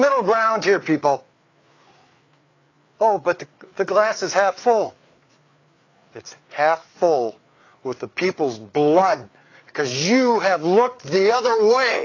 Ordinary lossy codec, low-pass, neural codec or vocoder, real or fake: AAC, 32 kbps; 7.2 kHz; codec, 16 kHz, 4 kbps, FreqCodec, larger model; fake